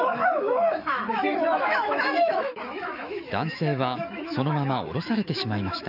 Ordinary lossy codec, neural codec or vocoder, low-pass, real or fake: none; autoencoder, 48 kHz, 128 numbers a frame, DAC-VAE, trained on Japanese speech; 5.4 kHz; fake